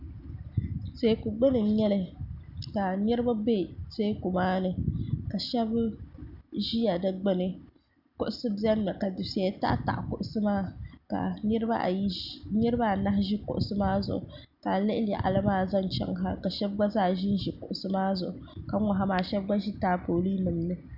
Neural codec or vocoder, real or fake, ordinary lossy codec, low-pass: none; real; Opus, 64 kbps; 5.4 kHz